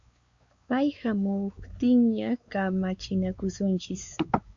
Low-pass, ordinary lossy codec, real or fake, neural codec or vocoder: 7.2 kHz; Opus, 64 kbps; fake; codec, 16 kHz, 4 kbps, X-Codec, WavLM features, trained on Multilingual LibriSpeech